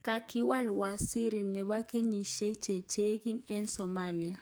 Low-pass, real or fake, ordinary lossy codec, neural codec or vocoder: none; fake; none; codec, 44.1 kHz, 2.6 kbps, SNAC